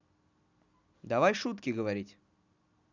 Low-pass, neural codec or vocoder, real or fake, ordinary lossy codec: 7.2 kHz; none; real; none